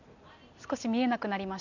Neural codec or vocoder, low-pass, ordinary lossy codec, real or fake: none; 7.2 kHz; none; real